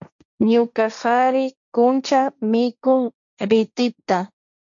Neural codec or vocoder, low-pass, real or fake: codec, 16 kHz, 1.1 kbps, Voila-Tokenizer; 7.2 kHz; fake